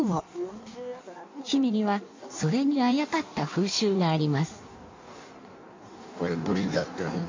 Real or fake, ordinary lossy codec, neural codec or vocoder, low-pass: fake; MP3, 48 kbps; codec, 16 kHz in and 24 kHz out, 1.1 kbps, FireRedTTS-2 codec; 7.2 kHz